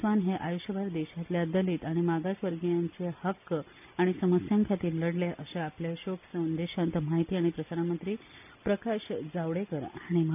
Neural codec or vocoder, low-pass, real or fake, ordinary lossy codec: none; 3.6 kHz; real; none